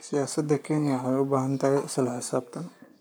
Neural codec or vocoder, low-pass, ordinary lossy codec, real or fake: codec, 44.1 kHz, 7.8 kbps, Pupu-Codec; none; none; fake